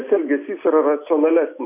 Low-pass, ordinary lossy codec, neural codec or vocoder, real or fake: 3.6 kHz; MP3, 24 kbps; none; real